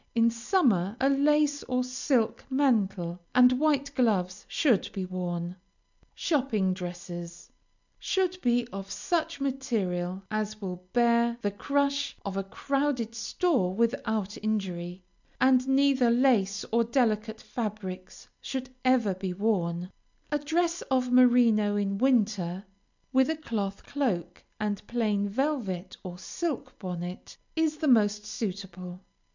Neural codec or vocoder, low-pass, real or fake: none; 7.2 kHz; real